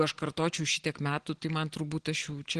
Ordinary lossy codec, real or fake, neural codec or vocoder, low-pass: Opus, 16 kbps; real; none; 9.9 kHz